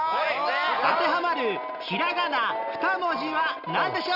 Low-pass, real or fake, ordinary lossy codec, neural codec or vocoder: 5.4 kHz; real; none; none